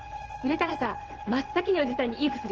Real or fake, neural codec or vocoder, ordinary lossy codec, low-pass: fake; vocoder, 22.05 kHz, 80 mel bands, WaveNeXt; Opus, 16 kbps; 7.2 kHz